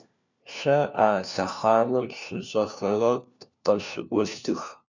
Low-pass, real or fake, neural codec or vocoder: 7.2 kHz; fake; codec, 16 kHz, 1 kbps, FunCodec, trained on LibriTTS, 50 frames a second